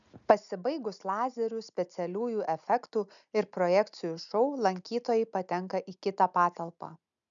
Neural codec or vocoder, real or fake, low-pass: none; real; 7.2 kHz